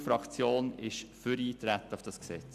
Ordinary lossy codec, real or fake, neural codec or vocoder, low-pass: none; real; none; 14.4 kHz